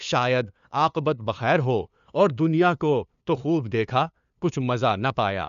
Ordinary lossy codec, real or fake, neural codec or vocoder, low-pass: none; fake; codec, 16 kHz, 2 kbps, FunCodec, trained on LibriTTS, 25 frames a second; 7.2 kHz